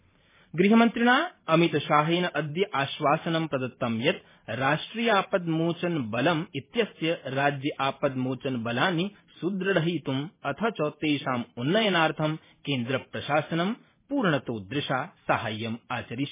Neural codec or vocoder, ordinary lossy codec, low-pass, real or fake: vocoder, 44.1 kHz, 128 mel bands every 512 samples, BigVGAN v2; MP3, 16 kbps; 3.6 kHz; fake